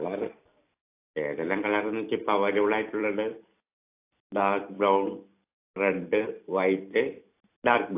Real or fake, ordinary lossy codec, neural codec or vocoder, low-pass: fake; AAC, 24 kbps; vocoder, 44.1 kHz, 128 mel bands every 256 samples, BigVGAN v2; 3.6 kHz